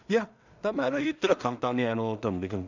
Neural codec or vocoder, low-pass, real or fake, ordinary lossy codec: codec, 16 kHz in and 24 kHz out, 0.4 kbps, LongCat-Audio-Codec, two codebook decoder; 7.2 kHz; fake; none